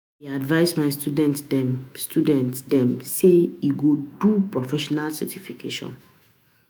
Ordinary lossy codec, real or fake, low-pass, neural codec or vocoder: none; fake; none; autoencoder, 48 kHz, 128 numbers a frame, DAC-VAE, trained on Japanese speech